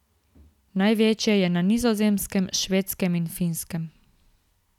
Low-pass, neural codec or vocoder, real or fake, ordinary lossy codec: 19.8 kHz; none; real; none